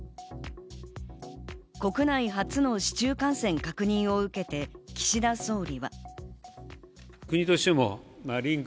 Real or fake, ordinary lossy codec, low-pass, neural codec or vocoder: real; none; none; none